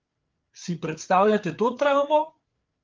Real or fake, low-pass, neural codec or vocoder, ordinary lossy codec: fake; 7.2 kHz; codec, 16 kHz, 4 kbps, FreqCodec, larger model; Opus, 32 kbps